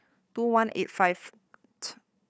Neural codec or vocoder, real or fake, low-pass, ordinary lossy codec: codec, 16 kHz, 4 kbps, FunCodec, trained on Chinese and English, 50 frames a second; fake; none; none